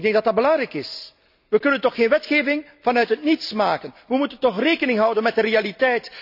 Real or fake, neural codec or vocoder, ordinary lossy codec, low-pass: real; none; none; 5.4 kHz